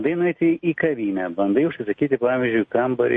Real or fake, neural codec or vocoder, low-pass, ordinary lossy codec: real; none; 10.8 kHz; MP3, 48 kbps